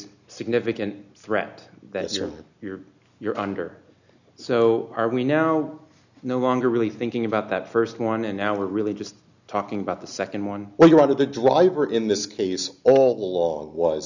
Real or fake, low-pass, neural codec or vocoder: real; 7.2 kHz; none